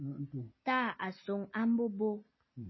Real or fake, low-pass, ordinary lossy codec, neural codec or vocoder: real; 7.2 kHz; MP3, 24 kbps; none